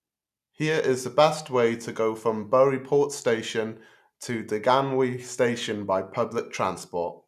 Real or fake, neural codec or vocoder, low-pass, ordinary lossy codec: real; none; 14.4 kHz; none